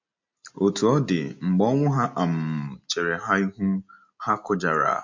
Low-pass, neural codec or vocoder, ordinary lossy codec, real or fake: 7.2 kHz; none; MP3, 48 kbps; real